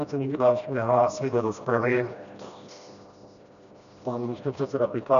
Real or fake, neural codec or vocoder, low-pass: fake; codec, 16 kHz, 1 kbps, FreqCodec, smaller model; 7.2 kHz